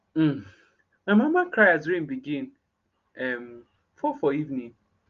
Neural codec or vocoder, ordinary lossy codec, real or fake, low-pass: none; Opus, 32 kbps; real; 7.2 kHz